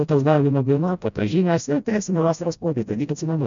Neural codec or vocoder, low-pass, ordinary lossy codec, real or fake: codec, 16 kHz, 0.5 kbps, FreqCodec, smaller model; 7.2 kHz; MP3, 96 kbps; fake